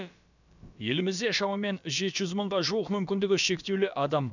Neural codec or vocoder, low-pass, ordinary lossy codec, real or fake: codec, 16 kHz, about 1 kbps, DyCAST, with the encoder's durations; 7.2 kHz; none; fake